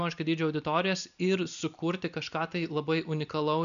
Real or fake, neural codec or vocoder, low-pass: real; none; 7.2 kHz